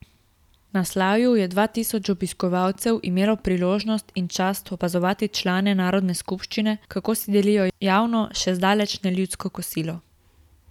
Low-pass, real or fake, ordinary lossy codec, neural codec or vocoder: 19.8 kHz; real; none; none